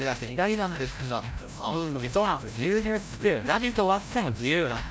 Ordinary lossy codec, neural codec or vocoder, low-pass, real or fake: none; codec, 16 kHz, 0.5 kbps, FreqCodec, larger model; none; fake